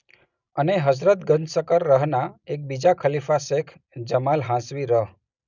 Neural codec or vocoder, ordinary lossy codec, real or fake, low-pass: none; none; real; 7.2 kHz